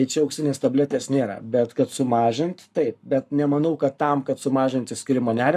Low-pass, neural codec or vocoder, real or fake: 14.4 kHz; codec, 44.1 kHz, 7.8 kbps, Pupu-Codec; fake